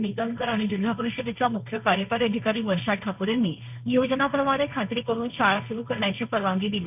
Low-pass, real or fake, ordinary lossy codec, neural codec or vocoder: 3.6 kHz; fake; none; codec, 16 kHz, 1.1 kbps, Voila-Tokenizer